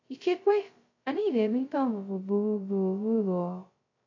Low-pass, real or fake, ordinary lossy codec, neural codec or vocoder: 7.2 kHz; fake; AAC, 48 kbps; codec, 16 kHz, 0.2 kbps, FocalCodec